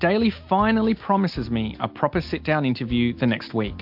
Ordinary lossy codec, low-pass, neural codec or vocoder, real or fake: MP3, 48 kbps; 5.4 kHz; none; real